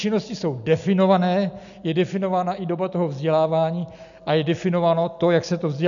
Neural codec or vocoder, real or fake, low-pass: none; real; 7.2 kHz